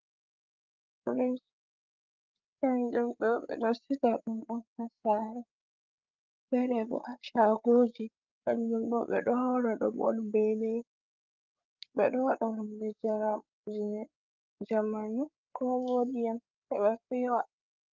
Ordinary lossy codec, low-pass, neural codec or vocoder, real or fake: Opus, 24 kbps; 7.2 kHz; codec, 16 kHz, 8 kbps, FreqCodec, larger model; fake